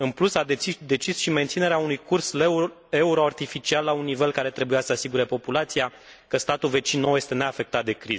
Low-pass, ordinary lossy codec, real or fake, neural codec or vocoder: none; none; real; none